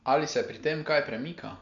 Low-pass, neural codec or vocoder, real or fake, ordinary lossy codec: 7.2 kHz; none; real; MP3, 64 kbps